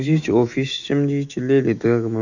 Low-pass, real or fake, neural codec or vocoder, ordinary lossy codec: 7.2 kHz; fake; autoencoder, 48 kHz, 128 numbers a frame, DAC-VAE, trained on Japanese speech; none